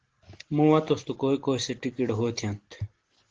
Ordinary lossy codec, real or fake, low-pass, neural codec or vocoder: Opus, 16 kbps; real; 7.2 kHz; none